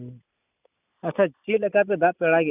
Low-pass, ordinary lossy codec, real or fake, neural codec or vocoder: 3.6 kHz; none; real; none